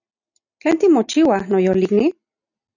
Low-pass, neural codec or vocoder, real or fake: 7.2 kHz; none; real